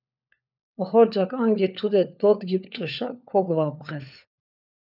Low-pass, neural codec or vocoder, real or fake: 5.4 kHz; codec, 16 kHz, 4 kbps, FunCodec, trained on LibriTTS, 50 frames a second; fake